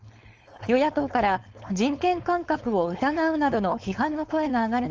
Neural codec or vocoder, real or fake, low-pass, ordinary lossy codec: codec, 16 kHz, 4.8 kbps, FACodec; fake; 7.2 kHz; Opus, 24 kbps